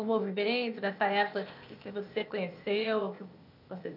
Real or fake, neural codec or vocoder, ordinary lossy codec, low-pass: fake; codec, 16 kHz, 0.8 kbps, ZipCodec; none; 5.4 kHz